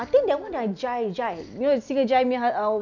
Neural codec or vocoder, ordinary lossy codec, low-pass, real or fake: none; none; 7.2 kHz; real